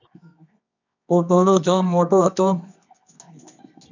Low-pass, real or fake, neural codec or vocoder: 7.2 kHz; fake; codec, 24 kHz, 0.9 kbps, WavTokenizer, medium music audio release